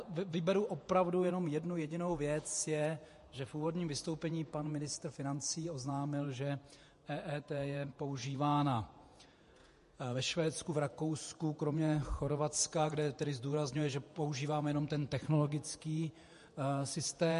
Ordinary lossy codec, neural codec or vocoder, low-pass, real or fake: MP3, 48 kbps; vocoder, 48 kHz, 128 mel bands, Vocos; 14.4 kHz; fake